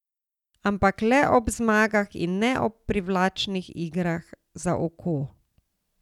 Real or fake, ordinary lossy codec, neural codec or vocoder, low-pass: real; none; none; 19.8 kHz